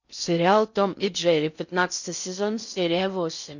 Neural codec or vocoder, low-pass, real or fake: codec, 16 kHz in and 24 kHz out, 0.6 kbps, FocalCodec, streaming, 2048 codes; 7.2 kHz; fake